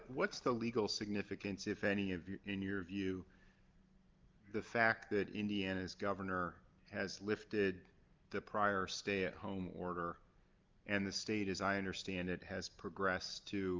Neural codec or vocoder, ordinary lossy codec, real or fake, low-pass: none; Opus, 24 kbps; real; 7.2 kHz